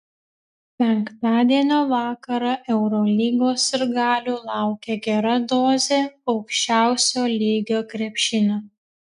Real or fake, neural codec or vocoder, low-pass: real; none; 10.8 kHz